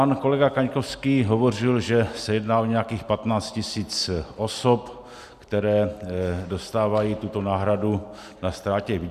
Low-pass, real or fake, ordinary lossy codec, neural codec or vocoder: 14.4 kHz; real; Opus, 64 kbps; none